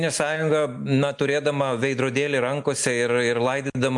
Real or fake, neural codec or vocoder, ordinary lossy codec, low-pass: real; none; MP3, 64 kbps; 10.8 kHz